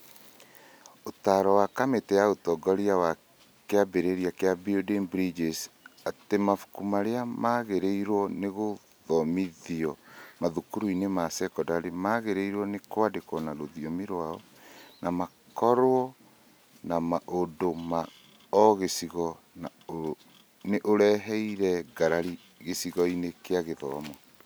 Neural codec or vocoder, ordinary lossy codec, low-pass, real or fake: none; none; none; real